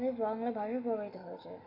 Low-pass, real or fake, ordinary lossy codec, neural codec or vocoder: 5.4 kHz; real; none; none